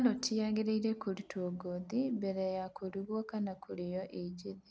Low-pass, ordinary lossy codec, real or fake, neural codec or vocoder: none; none; real; none